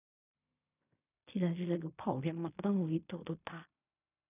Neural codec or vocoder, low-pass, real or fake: codec, 16 kHz in and 24 kHz out, 0.4 kbps, LongCat-Audio-Codec, fine tuned four codebook decoder; 3.6 kHz; fake